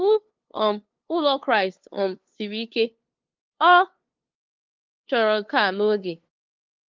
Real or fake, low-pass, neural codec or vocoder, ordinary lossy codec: fake; 7.2 kHz; codec, 16 kHz, 2 kbps, FunCodec, trained on LibriTTS, 25 frames a second; Opus, 32 kbps